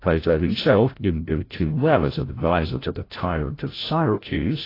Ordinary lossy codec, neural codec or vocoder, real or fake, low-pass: AAC, 24 kbps; codec, 16 kHz, 0.5 kbps, FreqCodec, larger model; fake; 5.4 kHz